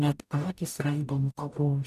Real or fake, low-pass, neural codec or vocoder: fake; 14.4 kHz; codec, 44.1 kHz, 0.9 kbps, DAC